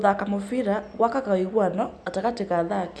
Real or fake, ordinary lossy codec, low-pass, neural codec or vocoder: real; none; none; none